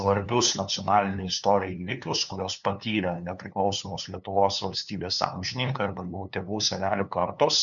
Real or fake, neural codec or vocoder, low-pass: fake; codec, 16 kHz, 2 kbps, FunCodec, trained on LibriTTS, 25 frames a second; 7.2 kHz